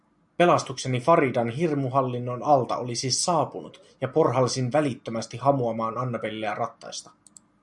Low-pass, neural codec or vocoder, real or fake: 10.8 kHz; none; real